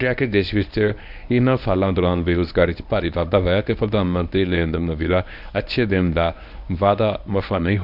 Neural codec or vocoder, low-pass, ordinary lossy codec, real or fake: codec, 24 kHz, 0.9 kbps, WavTokenizer, medium speech release version 1; 5.4 kHz; none; fake